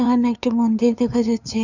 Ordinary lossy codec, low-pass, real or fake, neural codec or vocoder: none; 7.2 kHz; fake; codec, 16 kHz, 2 kbps, FunCodec, trained on LibriTTS, 25 frames a second